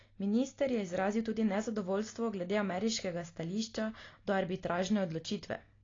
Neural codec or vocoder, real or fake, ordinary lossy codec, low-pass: none; real; AAC, 32 kbps; 7.2 kHz